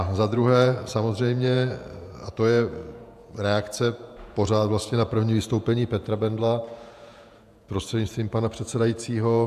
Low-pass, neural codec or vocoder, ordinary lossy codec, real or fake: 14.4 kHz; vocoder, 48 kHz, 128 mel bands, Vocos; AAC, 96 kbps; fake